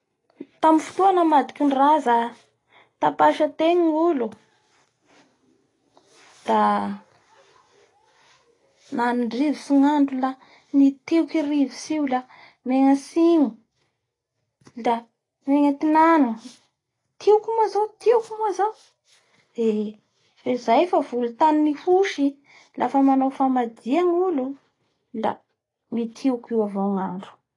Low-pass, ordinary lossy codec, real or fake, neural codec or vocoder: 10.8 kHz; AAC, 32 kbps; real; none